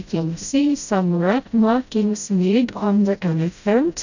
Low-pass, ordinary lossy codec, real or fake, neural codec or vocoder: 7.2 kHz; none; fake; codec, 16 kHz, 0.5 kbps, FreqCodec, smaller model